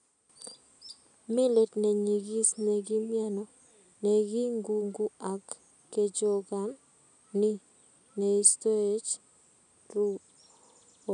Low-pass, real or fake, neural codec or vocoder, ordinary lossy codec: 9.9 kHz; real; none; none